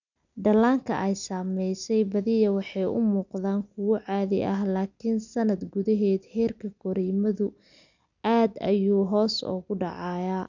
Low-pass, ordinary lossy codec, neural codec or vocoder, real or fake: 7.2 kHz; none; none; real